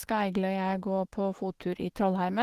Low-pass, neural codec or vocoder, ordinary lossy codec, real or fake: 14.4 kHz; autoencoder, 48 kHz, 128 numbers a frame, DAC-VAE, trained on Japanese speech; Opus, 16 kbps; fake